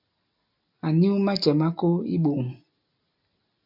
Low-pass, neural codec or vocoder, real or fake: 5.4 kHz; none; real